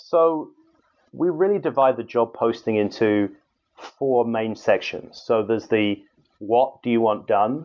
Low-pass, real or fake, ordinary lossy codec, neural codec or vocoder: 7.2 kHz; real; MP3, 64 kbps; none